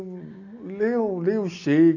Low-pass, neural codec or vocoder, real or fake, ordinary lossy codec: 7.2 kHz; none; real; none